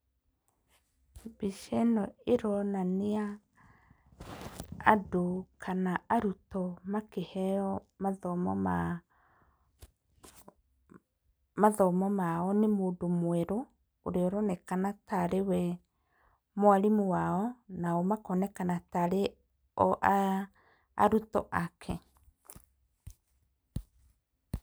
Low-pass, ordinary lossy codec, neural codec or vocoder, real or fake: none; none; none; real